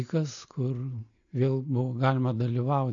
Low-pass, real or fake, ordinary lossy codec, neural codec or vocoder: 7.2 kHz; real; AAC, 64 kbps; none